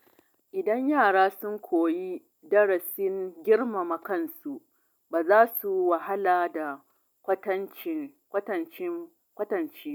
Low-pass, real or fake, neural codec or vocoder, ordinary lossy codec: 19.8 kHz; real; none; none